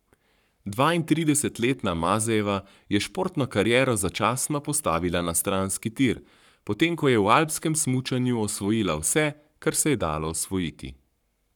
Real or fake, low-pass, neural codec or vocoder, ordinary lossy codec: fake; 19.8 kHz; codec, 44.1 kHz, 7.8 kbps, Pupu-Codec; none